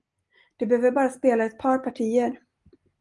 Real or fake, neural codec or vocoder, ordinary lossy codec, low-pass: real; none; Opus, 24 kbps; 10.8 kHz